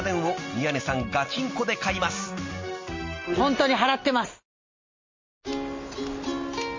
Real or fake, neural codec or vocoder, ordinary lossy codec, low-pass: real; none; MP3, 32 kbps; 7.2 kHz